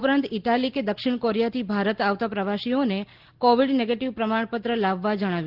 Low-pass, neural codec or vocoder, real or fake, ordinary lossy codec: 5.4 kHz; none; real; Opus, 16 kbps